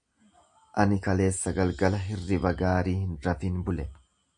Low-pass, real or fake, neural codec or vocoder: 9.9 kHz; real; none